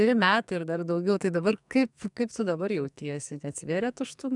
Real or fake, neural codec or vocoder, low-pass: fake; codec, 44.1 kHz, 2.6 kbps, SNAC; 10.8 kHz